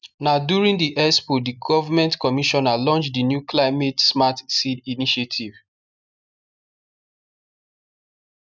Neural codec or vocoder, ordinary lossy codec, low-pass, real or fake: none; none; 7.2 kHz; real